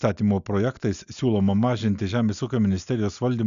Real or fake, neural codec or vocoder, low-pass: real; none; 7.2 kHz